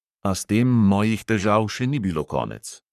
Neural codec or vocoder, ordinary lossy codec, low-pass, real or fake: codec, 44.1 kHz, 3.4 kbps, Pupu-Codec; none; 14.4 kHz; fake